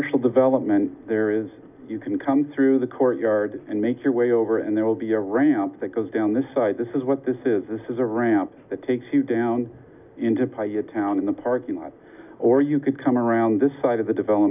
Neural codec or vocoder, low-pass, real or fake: none; 3.6 kHz; real